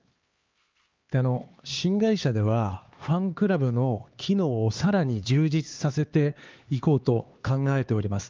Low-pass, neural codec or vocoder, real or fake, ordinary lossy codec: 7.2 kHz; codec, 16 kHz, 2 kbps, X-Codec, HuBERT features, trained on LibriSpeech; fake; Opus, 32 kbps